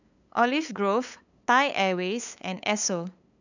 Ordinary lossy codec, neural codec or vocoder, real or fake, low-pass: none; codec, 16 kHz, 8 kbps, FunCodec, trained on LibriTTS, 25 frames a second; fake; 7.2 kHz